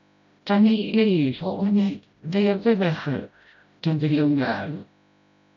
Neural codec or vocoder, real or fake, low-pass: codec, 16 kHz, 0.5 kbps, FreqCodec, smaller model; fake; 7.2 kHz